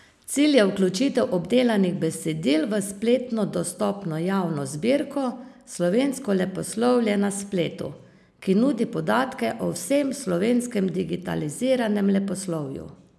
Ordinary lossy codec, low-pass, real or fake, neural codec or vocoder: none; none; real; none